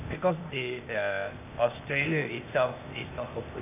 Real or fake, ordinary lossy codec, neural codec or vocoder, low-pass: fake; none; codec, 16 kHz, 0.8 kbps, ZipCodec; 3.6 kHz